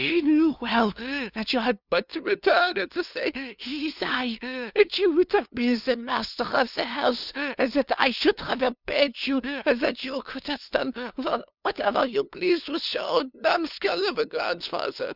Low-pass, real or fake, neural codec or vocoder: 5.4 kHz; fake; codec, 16 kHz, 2 kbps, FunCodec, trained on LibriTTS, 25 frames a second